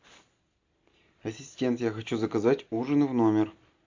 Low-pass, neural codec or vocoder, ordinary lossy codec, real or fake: 7.2 kHz; none; MP3, 64 kbps; real